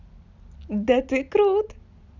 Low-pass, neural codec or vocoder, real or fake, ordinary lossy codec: 7.2 kHz; none; real; none